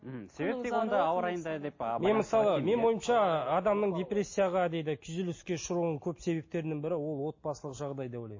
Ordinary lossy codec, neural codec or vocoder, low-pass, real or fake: MP3, 32 kbps; none; 7.2 kHz; real